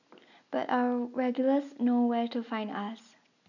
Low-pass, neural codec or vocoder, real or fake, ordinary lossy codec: 7.2 kHz; none; real; none